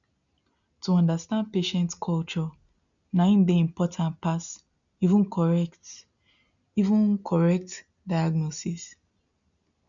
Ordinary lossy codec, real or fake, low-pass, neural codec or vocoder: none; real; 7.2 kHz; none